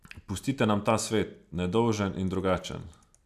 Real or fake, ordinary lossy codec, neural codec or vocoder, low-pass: real; none; none; 14.4 kHz